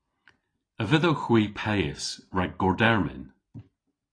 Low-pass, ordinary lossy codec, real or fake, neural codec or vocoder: 9.9 kHz; AAC, 32 kbps; real; none